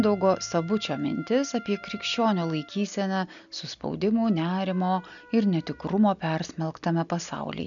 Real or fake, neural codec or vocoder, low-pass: real; none; 7.2 kHz